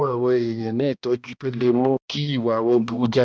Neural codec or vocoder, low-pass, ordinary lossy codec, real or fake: codec, 16 kHz, 1 kbps, X-Codec, HuBERT features, trained on balanced general audio; none; none; fake